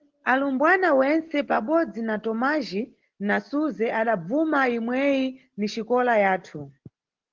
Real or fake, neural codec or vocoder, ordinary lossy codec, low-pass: real; none; Opus, 16 kbps; 7.2 kHz